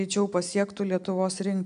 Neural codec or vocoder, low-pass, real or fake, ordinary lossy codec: none; 9.9 kHz; real; AAC, 96 kbps